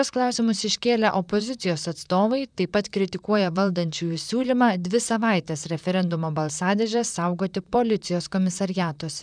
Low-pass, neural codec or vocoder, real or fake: 9.9 kHz; vocoder, 44.1 kHz, 128 mel bands, Pupu-Vocoder; fake